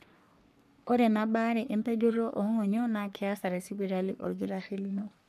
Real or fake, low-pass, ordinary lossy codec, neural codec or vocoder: fake; 14.4 kHz; none; codec, 44.1 kHz, 3.4 kbps, Pupu-Codec